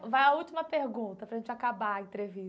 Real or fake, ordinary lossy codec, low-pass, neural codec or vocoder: real; none; none; none